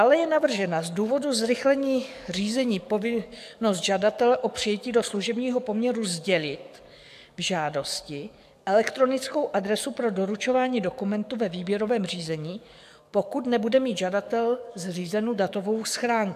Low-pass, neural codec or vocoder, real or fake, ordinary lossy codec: 14.4 kHz; codec, 44.1 kHz, 7.8 kbps, DAC; fake; AAC, 96 kbps